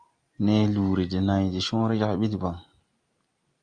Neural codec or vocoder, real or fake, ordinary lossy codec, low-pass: none; real; Opus, 32 kbps; 9.9 kHz